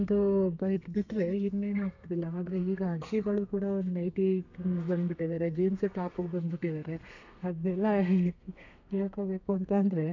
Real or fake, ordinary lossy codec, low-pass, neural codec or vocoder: fake; none; 7.2 kHz; codec, 32 kHz, 1.9 kbps, SNAC